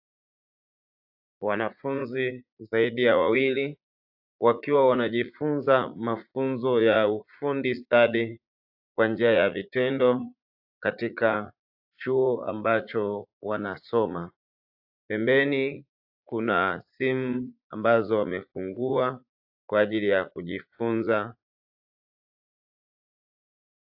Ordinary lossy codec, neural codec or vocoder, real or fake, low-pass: AAC, 48 kbps; vocoder, 44.1 kHz, 80 mel bands, Vocos; fake; 5.4 kHz